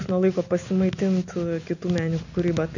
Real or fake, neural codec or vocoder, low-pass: real; none; 7.2 kHz